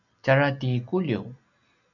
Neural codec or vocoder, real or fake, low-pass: none; real; 7.2 kHz